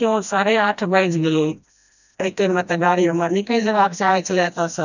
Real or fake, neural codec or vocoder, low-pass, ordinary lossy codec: fake; codec, 16 kHz, 1 kbps, FreqCodec, smaller model; 7.2 kHz; none